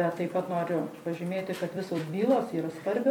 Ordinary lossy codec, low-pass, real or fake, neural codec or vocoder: MP3, 96 kbps; 19.8 kHz; fake; vocoder, 44.1 kHz, 128 mel bands every 256 samples, BigVGAN v2